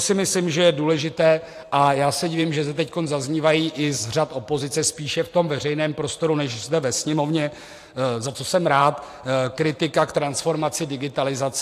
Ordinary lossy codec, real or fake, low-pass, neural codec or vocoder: AAC, 64 kbps; fake; 14.4 kHz; vocoder, 44.1 kHz, 128 mel bands every 512 samples, BigVGAN v2